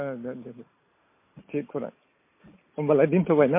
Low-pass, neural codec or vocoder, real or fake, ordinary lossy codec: 3.6 kHz; none; real; MP3, 24 kbps